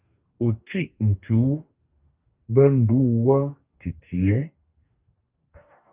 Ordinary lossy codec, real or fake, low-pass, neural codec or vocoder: Opus, 24 kbps; fake; 3.6 kHz; codec, 44.1 kHz, 2.6 kbps, DAC